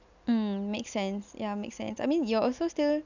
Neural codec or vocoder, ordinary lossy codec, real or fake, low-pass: none; none; real; 7.2 kHz